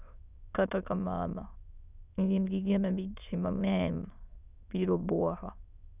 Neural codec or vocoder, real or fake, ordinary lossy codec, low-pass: autoencoder, 22.05 kHz, a latent of 192 numbers a frame, VITS, trained on many speakers; fake; none; 3.6 kHz